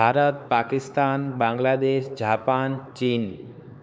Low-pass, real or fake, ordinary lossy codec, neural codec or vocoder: none; fake; none; codec, 16 kHz, 4 kbps, X-Codec, HuBERT features, trained on LibriSpeech